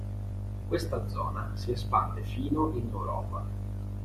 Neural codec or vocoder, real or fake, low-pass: vocoder, 48 kHz, 128 mel bands, Vocos; fake; 14.4 kHz